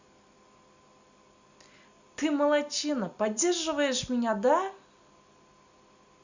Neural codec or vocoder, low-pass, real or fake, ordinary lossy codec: none; 7.2 kHz; real; Opus, 64 kbps